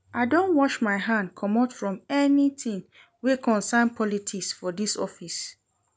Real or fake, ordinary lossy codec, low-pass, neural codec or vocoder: real; none; none; none